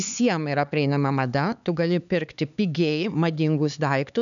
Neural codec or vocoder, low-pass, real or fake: codec, 16 kHz, 4 kbps, X-Codec, HuBERT features, trained on LibriSpeech; 7.2 kHz; fake